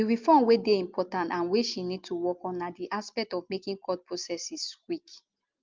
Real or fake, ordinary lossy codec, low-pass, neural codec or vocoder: real; Opus, 32 kbps; 7.2 kHz; none